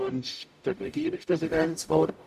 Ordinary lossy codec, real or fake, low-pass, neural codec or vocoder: none; fake; 14.4 kHz; codec, 44.1 kHz, 0.9 kbps, DAC